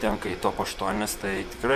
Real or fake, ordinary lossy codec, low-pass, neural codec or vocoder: fake; Opus, 64 kbps; 14.4 kHz; vocoder, 44.1 kHz, 128 mel bands, Pupu-Vocoder